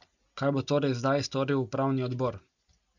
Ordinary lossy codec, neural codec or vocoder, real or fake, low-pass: none; none; real; 7.2 kHz